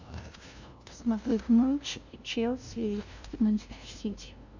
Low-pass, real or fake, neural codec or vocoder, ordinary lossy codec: 7.2 kHz; fake; codec, 16 kHz, 0.5 kbps, FunCodec, trained on LibriTTS, 25 frames a second; MP3, 64 kbps